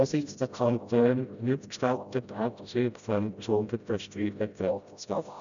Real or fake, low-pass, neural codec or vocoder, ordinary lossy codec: fake; 7.2 kHz; codec, 16 kHz, 0.5 kbps, FreqCodec, smaller model; none